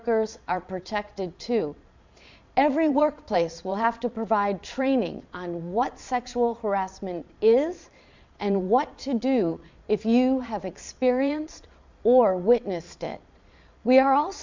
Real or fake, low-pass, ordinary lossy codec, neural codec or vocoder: fake; 7.2 kHz; MP3, 64 kbps; vocoder, 22.05 kHz, 80 mel bands, Vocos